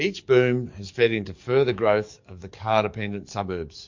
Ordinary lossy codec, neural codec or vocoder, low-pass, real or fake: MP3, 48 kbps; codec, 44.1 kHz, 7.8 kbps, DAC; 7.2 kHz; fake